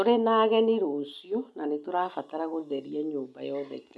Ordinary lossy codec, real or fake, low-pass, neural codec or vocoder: none; real; none; none